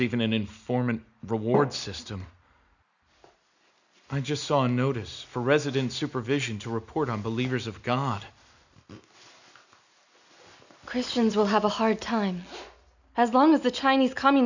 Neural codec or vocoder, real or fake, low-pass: none; real; 7.2 kHz